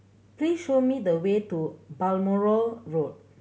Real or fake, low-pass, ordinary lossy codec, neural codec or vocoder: real; none; none; none